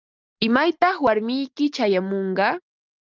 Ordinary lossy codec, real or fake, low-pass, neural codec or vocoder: Opus, 24 kbps; real; 7.2 kHz; none